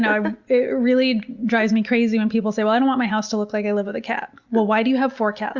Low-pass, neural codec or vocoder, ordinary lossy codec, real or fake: 7.2 kHz; none; Opus, 64 kbps; real